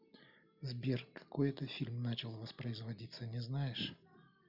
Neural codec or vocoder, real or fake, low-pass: none; real; 5.4 kHz